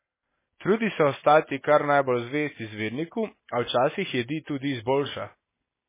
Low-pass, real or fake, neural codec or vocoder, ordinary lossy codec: 3.6 kHz; real; none; MP3, 16 kbps